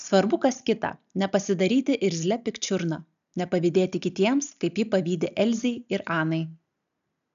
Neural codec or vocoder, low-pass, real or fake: none; 7.2 kHz; real